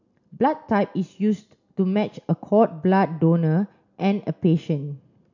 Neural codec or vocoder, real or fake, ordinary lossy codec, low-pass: none; real; none; 7.2 kHz